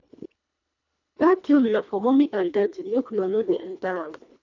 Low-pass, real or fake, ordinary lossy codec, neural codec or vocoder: 7.2 kHz; fake; none; codec, 24 kHz, 1.5 kbps, HILCodec